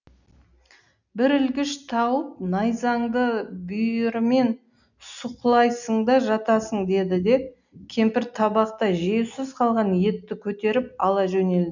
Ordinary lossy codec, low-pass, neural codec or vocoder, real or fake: none; 7.2 kHz; none; real